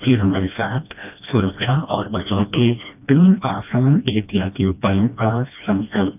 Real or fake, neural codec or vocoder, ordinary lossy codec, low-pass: fake; codec, 16 kHz, 1 kbps, FreqCodec, smaller model; none; 3.6 kHz